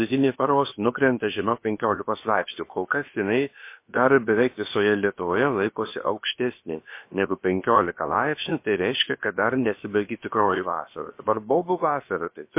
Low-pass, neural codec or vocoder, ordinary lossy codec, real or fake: 3.6 kHz; codec, 16 kHz, about 1 kbps, DyCAST, with the encoder's durations; MP3, 24 kbps; fake